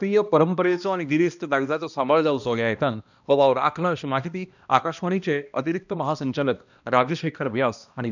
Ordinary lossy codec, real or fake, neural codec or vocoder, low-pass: none; fake; codec, 16 kHz, 1 kbps, X-Codec, HuBERT features, trained on balanced general audio; 7.2 kHz